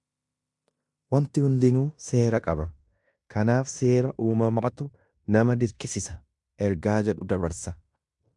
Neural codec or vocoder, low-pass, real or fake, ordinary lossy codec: codec, 16 kHz in and 24 kHz out, 0.9 kbps, LongCat-Audio-Codec, fine tuned four codebook decoder; 10.8 kHz; fake; MP3, 96 kbps